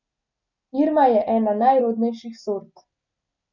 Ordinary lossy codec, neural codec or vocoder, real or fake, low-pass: none; none; real; 7.2 kHz